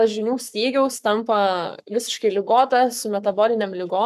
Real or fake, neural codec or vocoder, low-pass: fake; codec, 44.1 kHz, 7.8 kbps, Pupu-Codec; 14.4 kHz